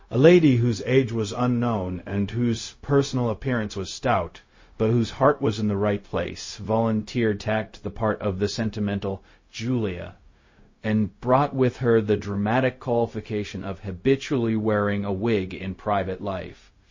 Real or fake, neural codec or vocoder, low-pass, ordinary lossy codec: fake; codec, 16 kHz, 0.4 kbps, LongCat-Audio-Codec; 7.2 kHz; MP3, 32 kbps